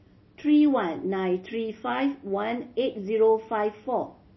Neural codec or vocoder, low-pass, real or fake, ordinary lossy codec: none; 7.2 kHz; real; MP3, 24 kbps